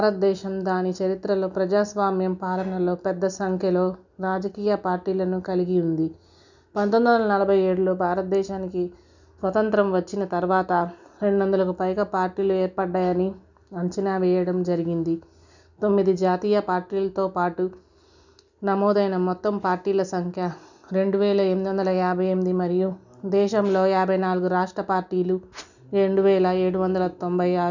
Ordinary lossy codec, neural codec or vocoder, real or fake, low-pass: none; none; real; 7.2 kHz